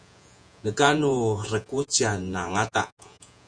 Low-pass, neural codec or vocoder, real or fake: 9.9 kHz; vocoder, 48 kHz, 128 mel bands, Vocos; fake